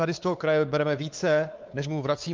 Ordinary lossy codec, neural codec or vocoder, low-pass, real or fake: Opus, 32 kbps; codec, 16 kHz, 4 kbps, X-Codec, WavLM features, trained on Multilingual LibriSpeech; 7.2 kHz; fake